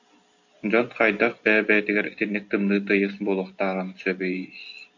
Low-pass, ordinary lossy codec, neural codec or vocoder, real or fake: 7.2 kHz; AAC, 48 kbps; none; real